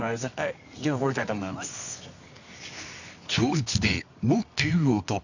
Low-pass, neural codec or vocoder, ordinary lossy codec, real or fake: 7.2 kHz; codec, 24 kHz, 0.9 kbps, WavTokenizer, medium music audio release; none; fake